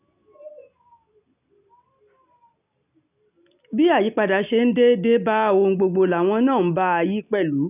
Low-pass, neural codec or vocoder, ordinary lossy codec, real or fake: 3.6 kHz; none; none; real